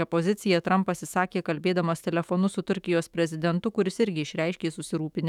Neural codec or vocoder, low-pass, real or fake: autoencoder, 48 kHz, 128 numbers a frame, DAC-VAE, trained on Japanese speech; 19.8 kHz; fake